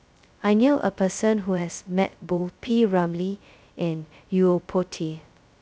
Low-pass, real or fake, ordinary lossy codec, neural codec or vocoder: none; fake; none; codec, 16 kHz, 0.2 kbps, FocalCodec